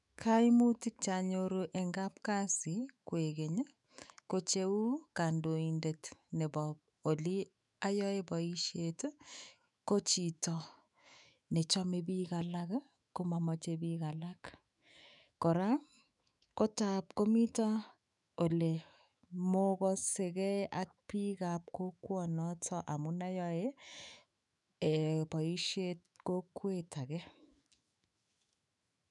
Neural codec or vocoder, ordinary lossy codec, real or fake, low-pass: autoencoder, 48 kHz, 128 numbers a frame, DAC-VAE, trained on Japanese speech; none; fake; 10.8 kHz